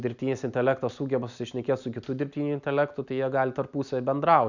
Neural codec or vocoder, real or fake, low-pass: none; real; 7.2 kHz